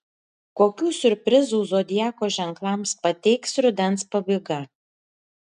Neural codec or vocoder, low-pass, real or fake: none; 10.8 kHz; real